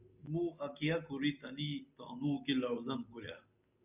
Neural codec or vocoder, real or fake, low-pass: none; real; 3.6 kHz